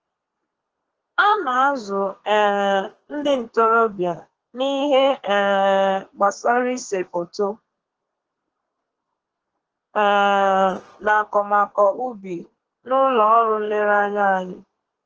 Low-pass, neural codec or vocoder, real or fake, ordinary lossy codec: 7.2 kHz; codec, 32 kHz, 1.9 kbps, SNAC; fake; Opus, 16 kbps